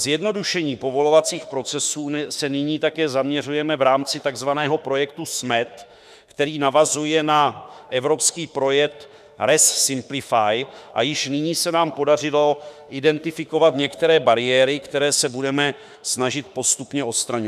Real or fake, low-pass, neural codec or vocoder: fake; 14.4 kHz; autoencoder, 48 kHz, 32 numbers a frame, DAC-VAE, trained on Japanese speech